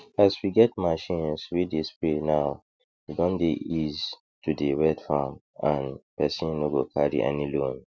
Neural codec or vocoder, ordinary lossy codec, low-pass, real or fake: none; none; none; real